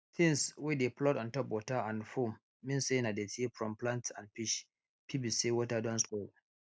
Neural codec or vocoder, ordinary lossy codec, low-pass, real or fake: none; none; none; real